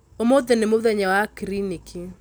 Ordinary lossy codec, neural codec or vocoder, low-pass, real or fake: none; none; none; real